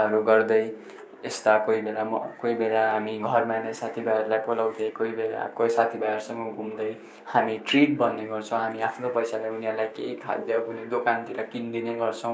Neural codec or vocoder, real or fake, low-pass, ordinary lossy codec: codec, 16 kHz, 6 kbps, DAC; fake; none; none